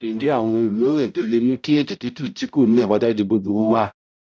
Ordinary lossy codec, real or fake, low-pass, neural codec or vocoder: none; fake; none; codec, 16 kHz, 0.5 kbps, X-Codec, HuBERT features, trained on balanced general audio